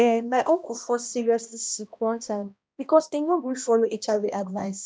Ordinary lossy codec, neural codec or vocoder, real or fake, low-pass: none; codec, 16 kHz, 1 kbps, X-Codec, HuBERT features, trained on balanced general audio; fake; none